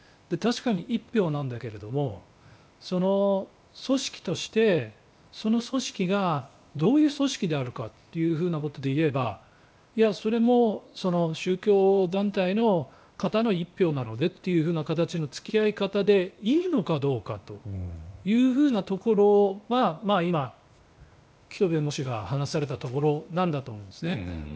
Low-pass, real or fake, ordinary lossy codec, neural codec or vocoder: none; fake; none; codec, 16 kHz, 0.8 kbps, ZipCodec